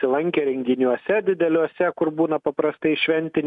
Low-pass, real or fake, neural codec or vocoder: 10.8 kHz; real; none